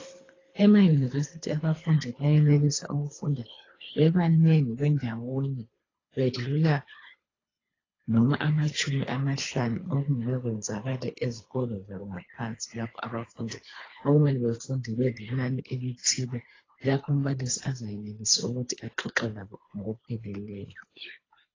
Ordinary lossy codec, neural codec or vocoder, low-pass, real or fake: AAC, 32 kbps; codec, 24 kHz, 3 kbps, HILCodec; 7.2 kHz; fake